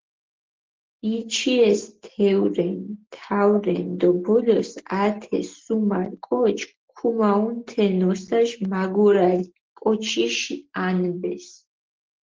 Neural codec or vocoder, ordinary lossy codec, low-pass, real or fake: none; Opus, 16 kbps; 7.2 kHz; real